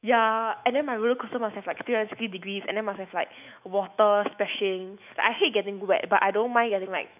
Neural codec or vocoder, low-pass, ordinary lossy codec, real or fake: autoencoder, 48 kHz, 128 numbers a frame, DAC-VAE, trained on Japanese speech; 3.6 kHz; none; fake